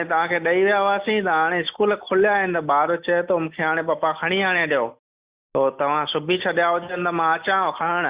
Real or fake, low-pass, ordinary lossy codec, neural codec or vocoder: real; 3.6 kHz; Opus, 64 kbps; none